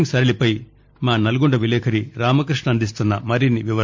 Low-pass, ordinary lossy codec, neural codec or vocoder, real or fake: 7.2 kHz; MP3, 48 kbps; none; real